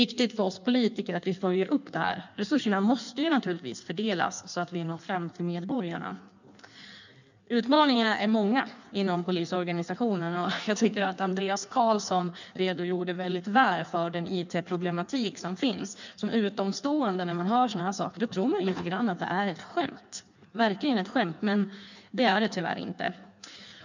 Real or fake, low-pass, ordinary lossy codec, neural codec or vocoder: fake; 7.2 kHz; none; codec, 16 kHz in and 24 kHz out, 1.1 kbps, FireRedTTS-2 codec